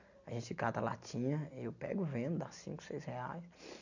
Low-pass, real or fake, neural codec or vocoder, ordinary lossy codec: 7.2 kHz; real; none; none